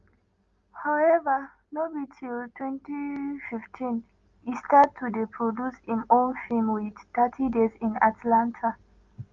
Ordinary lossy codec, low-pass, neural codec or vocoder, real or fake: Opus, 32 kbps; 7.2 kHz; none; real